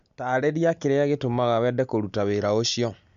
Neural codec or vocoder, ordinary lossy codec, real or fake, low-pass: none; none; real; 7.2 kHz